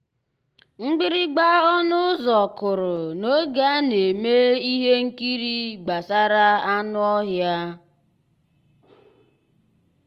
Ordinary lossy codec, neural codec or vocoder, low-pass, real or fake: Opus, 24 kbps; none; 14.4 kHz; real